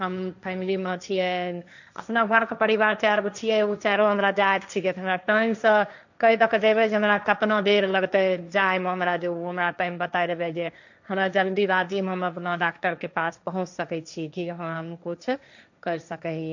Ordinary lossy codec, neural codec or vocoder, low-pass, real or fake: none; codec, 16 kHz, 1.1 kbps, Voila-Tokenizer; 7.2 kHz; fake